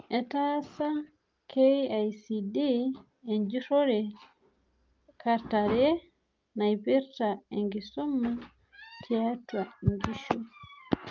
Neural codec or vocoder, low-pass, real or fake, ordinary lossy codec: none; 7.2 kHz; real; Opus, 24 kbps